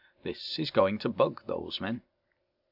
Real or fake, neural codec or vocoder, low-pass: real; none; 5.4 kHz